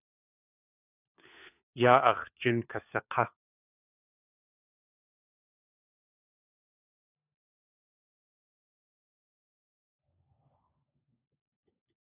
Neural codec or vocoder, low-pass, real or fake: codec, 16 kHz, 4 kbps, FunCodec, trained on LibriTTS, 50 frames a second; 3.6 kHz; fake